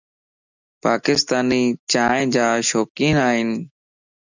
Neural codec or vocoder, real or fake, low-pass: none; real; 7.2 kHz